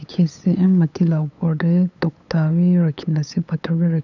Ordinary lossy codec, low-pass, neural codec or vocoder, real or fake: none; 7.2 kHz; codec, 16 kHz, 8 kbps, FunCodec, trained on LibriTTS, 25 frames a second; fake